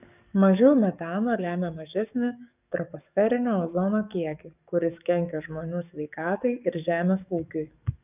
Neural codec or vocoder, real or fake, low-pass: codec, 44.1 kHz, 7.8 kbps, DAC; fake; 3.6 kHz